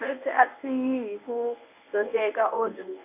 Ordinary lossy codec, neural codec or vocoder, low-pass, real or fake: AAC, 24 kbps; codec, 24 kHz, 0.9 kbps, WavTokenizer, medium speech release version 1; 3.6 kHz; fake